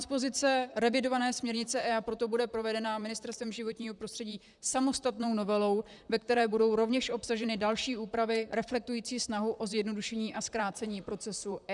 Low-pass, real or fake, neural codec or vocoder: 10.8 kHz; fake; vocoder, 44.1 kHz, 128 mel bands, Pupu-Vocoder